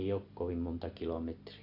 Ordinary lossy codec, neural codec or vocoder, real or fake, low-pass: none; none; real; 5.4 kHz